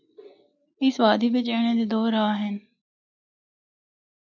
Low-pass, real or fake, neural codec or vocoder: 7.2 kHz; real; none